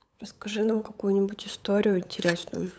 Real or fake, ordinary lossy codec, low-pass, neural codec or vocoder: fake; none; none; codec, 16 kHz, 8 kbps, FunCodec, trained on LibriTTS, 25 frames a second